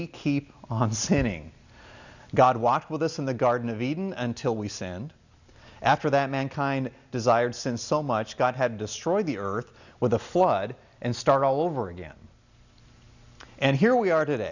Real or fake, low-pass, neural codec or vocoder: real; 7.2 kHz; none